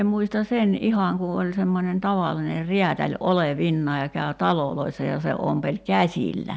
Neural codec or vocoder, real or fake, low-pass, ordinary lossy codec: none; real; none; none